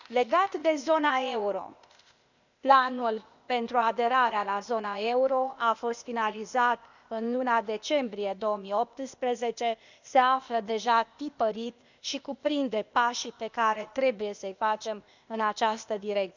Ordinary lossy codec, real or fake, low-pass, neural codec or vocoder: none; fake; 7.2 kHz; codec, 16 kHz, 0.8 kbps, ZipCodec